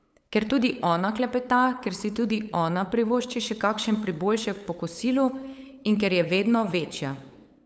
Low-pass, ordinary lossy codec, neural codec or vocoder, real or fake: none; none; codec, 16 kHz, 8 kbps, FunCodec, trained on LibriTTS, 25 frames a second; fake